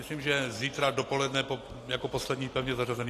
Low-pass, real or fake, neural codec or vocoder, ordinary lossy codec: 14.4 kHz; real; none; AAC, 48 kbps